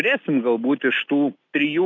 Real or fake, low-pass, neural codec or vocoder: real; 7.2 kHz; none